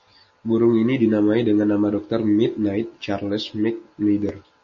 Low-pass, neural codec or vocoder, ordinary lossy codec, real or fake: 7.2 kHz; none; MP3, 32 kbps; real